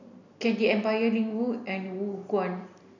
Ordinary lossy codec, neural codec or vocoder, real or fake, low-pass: none; none; real; 7.2 kHz